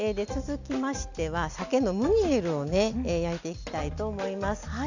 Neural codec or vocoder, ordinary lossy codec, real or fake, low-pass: none; none; real; 7.2 kHz